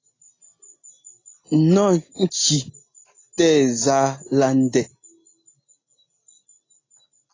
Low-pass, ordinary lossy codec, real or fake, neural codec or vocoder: 7.2 kHz; AAC, 32 kbps; real; none